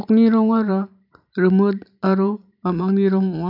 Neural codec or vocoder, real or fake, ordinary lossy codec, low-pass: vocoder, 44.1 kHz, 128 mel bands every 512 samples, BigVGAN v2; fake; none; 5.4 kHz